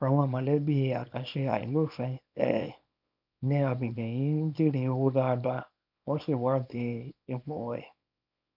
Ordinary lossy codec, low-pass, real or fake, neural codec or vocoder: none; 5.4 kHz; fake; codec, 24 kHz, 0.9 kbps, WavTokenizer, small release